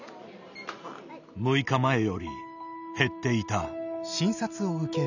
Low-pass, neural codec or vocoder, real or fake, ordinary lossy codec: 7.2 kHz; none; real; none